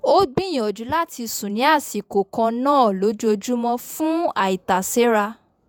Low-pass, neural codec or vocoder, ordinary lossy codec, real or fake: none; vocoder, 48 kHz, 128 mel bands, Vocos; none; fake